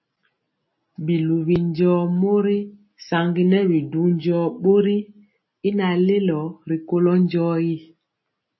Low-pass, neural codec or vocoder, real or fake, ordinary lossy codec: 7.2 kHz; none; real; MP3, 24 kbps